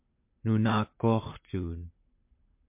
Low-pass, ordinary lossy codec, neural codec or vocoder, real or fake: 3.6 kHz; MP3, 32 kbps; vocoder, 44.1 kHz, 128 mel bands, Pupu-Vocoder; fake